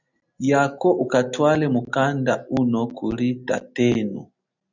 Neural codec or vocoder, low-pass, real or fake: none; 7.2 kHz; real